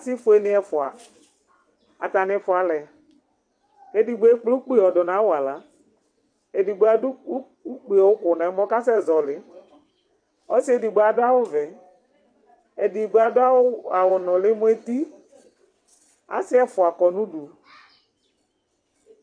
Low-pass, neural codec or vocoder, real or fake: 9.9 kHz; vocoder, 22.05 kHz, 80 mel bands, WaveNeXt; fake